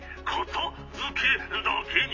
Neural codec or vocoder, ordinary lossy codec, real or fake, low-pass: none; AAC, 32 kbps; real; 7.2 kHz